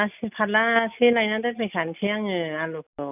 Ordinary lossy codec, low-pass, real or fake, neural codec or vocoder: none; 3.6 kHz; real; none